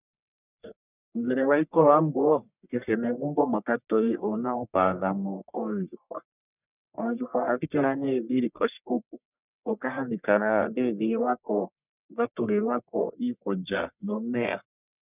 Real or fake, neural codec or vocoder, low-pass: fake; codec, 44.1 kHz, 1.7 kbps, Pupu-Codec; 3.6 kHz